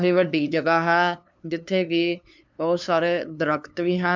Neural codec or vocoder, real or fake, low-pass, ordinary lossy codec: codec, 16 kHz, 2 kbps, FunCodec, trained on LibriTTS, 25 frames a second; fake; 7.2 kHz; MP3, 64 kbps